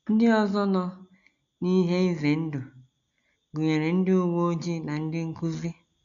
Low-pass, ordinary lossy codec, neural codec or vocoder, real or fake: 7.2 kHz; none; none; real